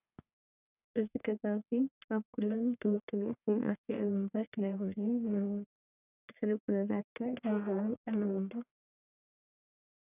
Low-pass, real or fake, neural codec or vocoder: 3.6 kHz; fake; codec, 44.1 kHz, 1.7 kbps, Pupu-Codec